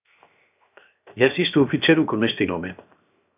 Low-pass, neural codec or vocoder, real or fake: 3.6 kHz; codec, 16 kHz, 0.7 kbps, FocalCodec; fake